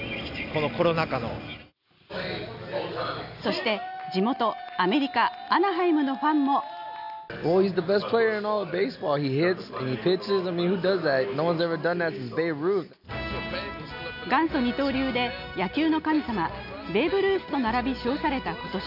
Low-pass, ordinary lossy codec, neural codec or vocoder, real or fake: 5.4 kHz; none; none; real